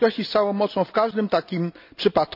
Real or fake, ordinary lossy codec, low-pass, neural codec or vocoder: real; none; 5.4 kHz; none